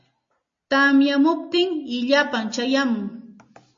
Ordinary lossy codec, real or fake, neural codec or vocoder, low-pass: MP3, 32 kbps; real; none; 7.2 kHz